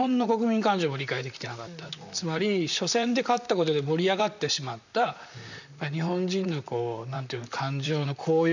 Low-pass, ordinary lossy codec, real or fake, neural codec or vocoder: 7.2 kHz; none; fake; vocoder, 44.1 kHz, 128 mel bands, Pupu-Vocoder